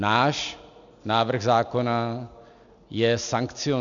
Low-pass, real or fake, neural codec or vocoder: 7.2 kHz; real; none